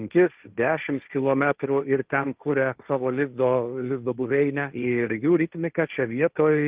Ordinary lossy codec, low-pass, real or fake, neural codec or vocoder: Opus, 32 kbps; 3.6 kHz; fake; codec, 16 kHz, 1.1 kbps, Voila-Tokenizer